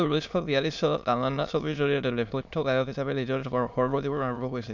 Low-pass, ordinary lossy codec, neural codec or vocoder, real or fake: 7.2 kHz; MP3, 64 kbps; autoencoder, 22.05 kHz, a latent of 192 numbers a frame, VITS, trained on many speakers; fake